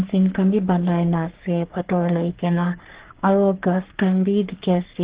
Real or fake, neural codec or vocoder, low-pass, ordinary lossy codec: fake; codec, 44.1 kHz, 3.4 kbps, Pupu-Codec; 3.6 kHz; Opus, 16 kbps